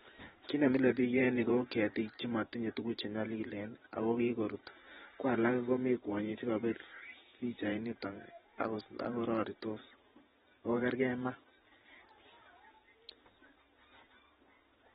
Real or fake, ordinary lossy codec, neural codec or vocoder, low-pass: fake; AAC, 16 kbps; vocoder, 44.1 kHz, 128 mel bands every 512 samples, BigVGAN v2; 19.8 kHz